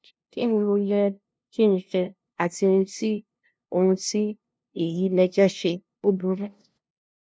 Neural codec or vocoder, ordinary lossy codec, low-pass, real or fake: codec, 16 kHz, 0.5 kbps, FunCodec, trained on LibriTTS, 25 frames a second; none; none; fake